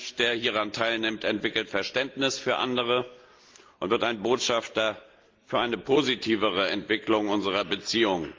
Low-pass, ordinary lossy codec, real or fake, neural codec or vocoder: 7.2 kHz; Opus, 32 kbps; real; none